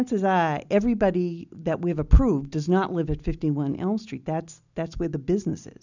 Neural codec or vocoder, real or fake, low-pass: none; real; 7.2 kHz